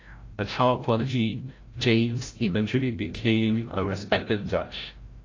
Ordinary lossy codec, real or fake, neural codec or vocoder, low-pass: AAC, 32 kbps; fake; codec, 16 kHz, 0.5 kbps, FreqCodec, larger model; 7.2 kHz